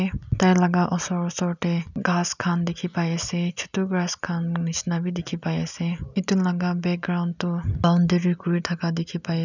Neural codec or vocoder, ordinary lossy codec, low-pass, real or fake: none; none; 7.2 kHz; real